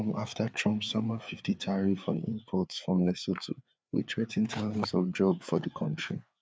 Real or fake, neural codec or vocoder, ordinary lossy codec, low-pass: fake; codec, 16 kHz, 4 kbps, FreqCodec, larger model; none; none